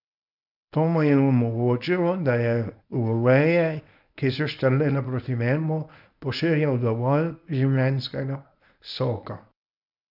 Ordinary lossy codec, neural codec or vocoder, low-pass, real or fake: none; codec, 24 kHz, 0.9 kbps, WavTokenizer, medium speech release version 1; 5.4 kHz; fake